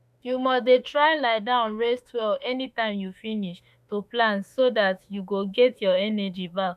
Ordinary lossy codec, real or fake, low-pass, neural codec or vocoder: none; fake; 14.4 kHz; autoencoder, 48 kHz, 32 numbers a frame, DAC-VAE, trained on Japanese speech